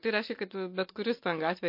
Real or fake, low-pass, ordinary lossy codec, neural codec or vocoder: real; 5.4 kHz; MP3, 32 kbps; none